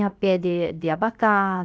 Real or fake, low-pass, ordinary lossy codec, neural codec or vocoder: fake; none; none; codec, 16 kHz, 0.7 kbps, FocalCodec